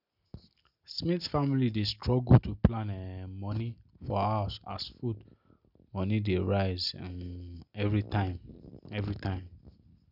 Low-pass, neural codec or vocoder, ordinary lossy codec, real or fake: 5.4 kHz; none; none; real